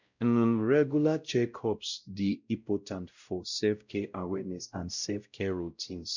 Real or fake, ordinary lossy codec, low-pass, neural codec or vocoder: fake; none; 7.2 kHz; codec, 16 kHz, 0.5 kbps, X-Codec, WavLM features, trained on Multilingual LibriSpeech